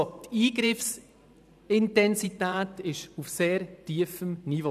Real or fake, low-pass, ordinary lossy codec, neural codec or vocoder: fake; 14.4 kHz; none; vocoder, 44.1 kHz, 128 mel bands every 512 samples, BigVGAN v2